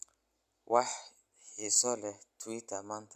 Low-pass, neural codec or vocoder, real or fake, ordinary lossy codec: 14.4 kHz; none; real; none